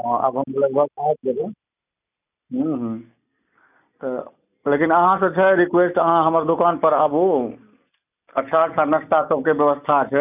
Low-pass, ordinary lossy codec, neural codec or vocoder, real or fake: 3.6 kHz; none; none; real